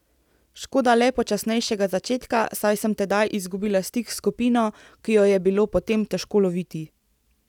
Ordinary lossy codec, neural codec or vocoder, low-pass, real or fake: none; none; 19.8 kHz; real